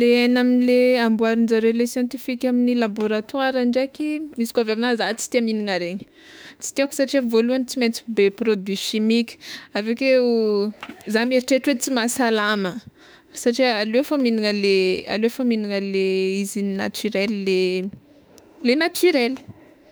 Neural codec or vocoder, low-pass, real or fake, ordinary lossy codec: autoencoder, 48 kHz, 32 numbers a frame, DAC-VAE, trained on Japanese speech; none; fake; none